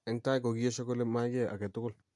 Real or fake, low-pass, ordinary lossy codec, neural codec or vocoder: real; 10.8 kHz; MP3, 64 kbps; none